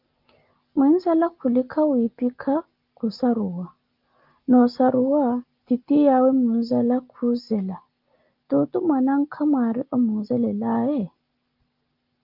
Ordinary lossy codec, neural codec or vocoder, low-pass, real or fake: Opus, 24 kbps; none; 5.4 kHz; real